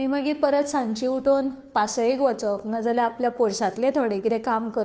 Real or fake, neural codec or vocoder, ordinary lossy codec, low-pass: fake; codec, 16 kHz, 2 kbps, FunCodec, trained on Chinese and English, 25 frames a second; none; none